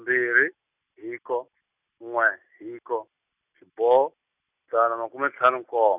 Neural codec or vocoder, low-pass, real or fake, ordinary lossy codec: none; 3.6 kHz; real; none